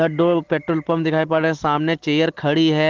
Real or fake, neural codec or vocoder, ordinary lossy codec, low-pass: real; none; Opus, 16 kbps; 7.2 kHz